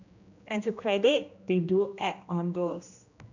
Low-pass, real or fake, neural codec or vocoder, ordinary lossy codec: 7.2 kHz; fake; codec, 16 kHz, 1 kbps, X-Codec, HuBERT features, trained on general audio; none